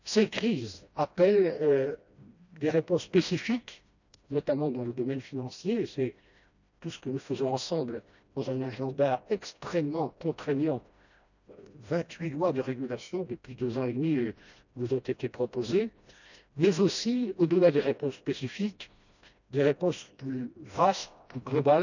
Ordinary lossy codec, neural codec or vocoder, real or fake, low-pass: none; codec, 16 kHz, 1 kbps, FreqCodec, smaller model; fake; 7.2 kHz